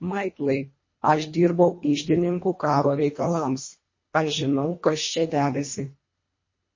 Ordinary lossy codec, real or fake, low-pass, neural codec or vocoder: MP3, 32 kbps; fake; 7.2 kHz; codec, 24 kHz, 1.5 kbps, HILCodec